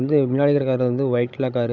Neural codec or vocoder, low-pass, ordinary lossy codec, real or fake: codec, 16 kHz, 16 kbps, FreqCodec, larger model; 7.2 kHz; none; fake